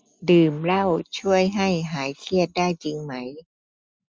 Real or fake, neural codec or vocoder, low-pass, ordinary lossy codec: real; none; none; none